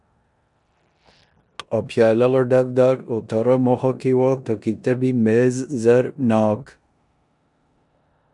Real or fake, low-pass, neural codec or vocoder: fake; 10.8 kHz; codec, 16 kHz in and 24 kHz out, 0.9 kbps, LongCat-Audio-Codec, four codebook decoder